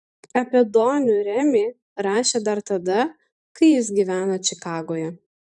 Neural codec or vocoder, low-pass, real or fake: vocoder, 44.1 kHz, 128 mel bands every 512 samples, BigVGAN v2; 10.8 kHz; fake